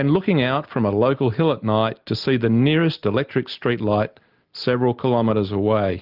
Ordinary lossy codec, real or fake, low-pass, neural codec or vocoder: Opus, 24 kbps; real; 5.4 kHz; none